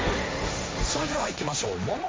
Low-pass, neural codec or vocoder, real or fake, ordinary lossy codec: none; codec, 16 kHz, 1.1 kbps, Voila-Tokenizer; fake; none